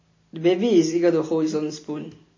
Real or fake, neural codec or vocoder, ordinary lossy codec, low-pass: real; none; MP3, 32 kbps; 7.2 kHz